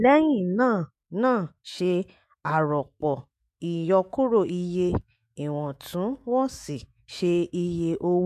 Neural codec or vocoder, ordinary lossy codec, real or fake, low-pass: autoencoder, 48 kHz, 128 numbers a frame, DAC-VAE, trained on Japanese speech; MP3, 64 kbps; fake; 14.4 kHz